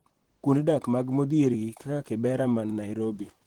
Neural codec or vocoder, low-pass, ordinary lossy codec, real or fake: vocoder, 44.1 kHz, 128 mel bands, Pupu-Vocoder; 19.8 kHz; Opus, 16 kbps; fake